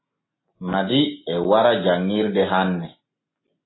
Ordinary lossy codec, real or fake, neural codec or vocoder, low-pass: AAC, 16 kbps; real; none; 7.2 kHz